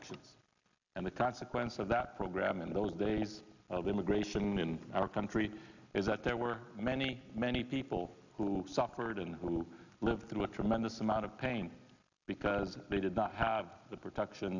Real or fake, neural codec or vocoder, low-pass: real; none; 7.2 kHz